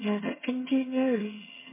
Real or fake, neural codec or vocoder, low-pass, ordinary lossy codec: fake; vocoder, 22.05 kHz, 80 mel bands, HiFi-GAN; 3.6 kHz; MP3, 16 kbps